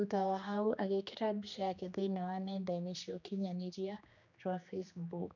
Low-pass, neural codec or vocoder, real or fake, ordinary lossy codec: 7.2 kHz; codec, 16 kHz, 2 kbps, X-Codec, HuBERT features, trained on general audio; fake; none